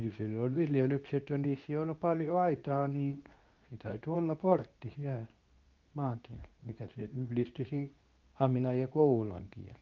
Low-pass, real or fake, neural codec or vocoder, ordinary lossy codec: 7.2 kHz; fake; codec, 24 kHz, 0.9 kbps, WavTokenizer, medium speech release version 2; Opus, 24 kbps